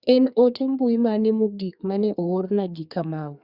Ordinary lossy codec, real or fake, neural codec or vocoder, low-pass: Opus, 64 kbps; fake; codec, 32 kHz, 1.9 kbps, SNAC; 5.4 kHz